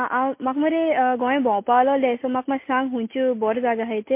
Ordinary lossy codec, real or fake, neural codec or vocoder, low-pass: MP3, 24 kbps; real; none; 3.6 kHz